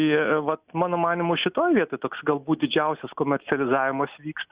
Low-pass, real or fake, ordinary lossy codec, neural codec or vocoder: 3.6 kHz; real; Opus, 64 kbps; none